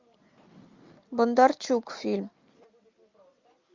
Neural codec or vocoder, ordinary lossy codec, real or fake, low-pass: none; MP3, 64 kbps; real; 7.2 kHz